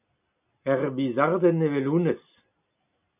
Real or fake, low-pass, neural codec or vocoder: real; 3.6 kHz; none